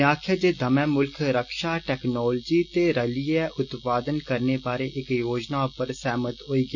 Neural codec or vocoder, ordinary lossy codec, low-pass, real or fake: none; none; 7.2 kHz; real